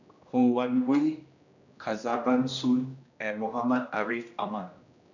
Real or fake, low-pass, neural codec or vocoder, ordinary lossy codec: fake; 7.2 kHz; codec, 16 kHz, 1 kbps, X-Codec, HuBERT features, trained on general audio; none